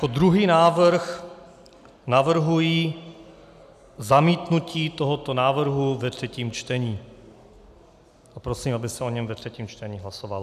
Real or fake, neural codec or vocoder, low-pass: real; none; 14.4 kHz